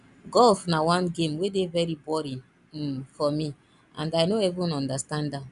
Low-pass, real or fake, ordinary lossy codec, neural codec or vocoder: 10.8 kHz; real; none; none